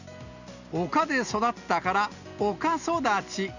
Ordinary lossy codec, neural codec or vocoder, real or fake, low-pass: none; none; real; 7.2 kHz